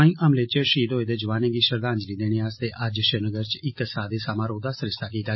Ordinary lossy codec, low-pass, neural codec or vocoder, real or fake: MP3, 24 kbps; 7.2 kHz; none; real